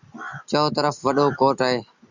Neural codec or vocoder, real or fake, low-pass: none; real; 7.2 kHz